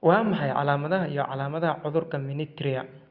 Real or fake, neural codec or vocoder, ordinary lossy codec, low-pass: real; none; Opus, 64 kbps; 5.4 kHz